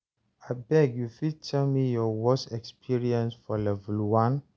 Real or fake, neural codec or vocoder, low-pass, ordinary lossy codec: real; none; none; none